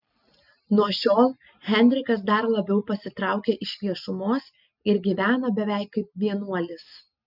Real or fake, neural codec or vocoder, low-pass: real; none; 5.4 kHz